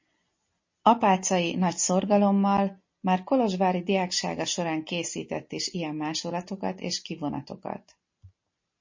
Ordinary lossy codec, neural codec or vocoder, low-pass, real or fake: MP3, 32 kbps; none; 7.2 kHz; real